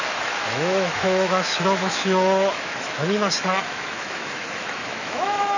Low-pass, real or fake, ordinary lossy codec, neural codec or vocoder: 7.2 kHz; fake; none; autoencoder, 48 kHz, 128 numbers a frame, DAC-VAE, trained on Japanese speech